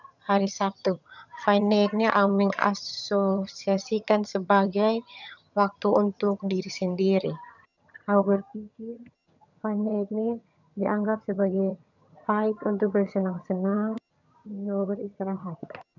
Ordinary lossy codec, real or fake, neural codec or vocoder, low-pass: none; fake; vocoder, 22.05 kHz, 80 mel bands, HiFi-GAN; 7.2 kHz